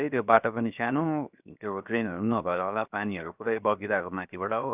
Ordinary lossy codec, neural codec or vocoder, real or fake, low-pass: none; codec, 16 kHz, 0.7 kbps, FocalCodec; fake; 3.6 kHz